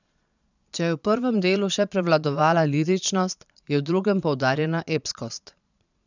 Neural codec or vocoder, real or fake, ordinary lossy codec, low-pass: vocoder, 22.05 kHz, 80 mel bands, Vocos; fake; none; 7.2 kHz